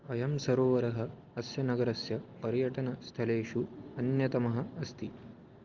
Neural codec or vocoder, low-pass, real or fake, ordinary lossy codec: none; 7.2 kHz; real; Opus, 32 kbps